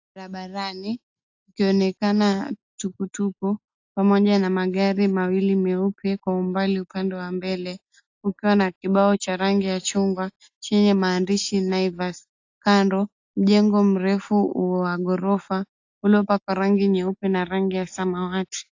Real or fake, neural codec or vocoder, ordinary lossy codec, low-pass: real; none; AAC, 48 kbps; 7.2 kHz